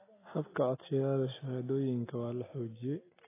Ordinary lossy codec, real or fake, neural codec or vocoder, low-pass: AAC, 16 kbps; real; none; 3.6 kHz